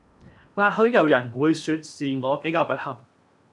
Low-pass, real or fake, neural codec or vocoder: 10.8 kHz; fake; codec, 16 kHz in and 24 kHz out, 0.8 kbps, FocalCodec, streaming, 65536 codes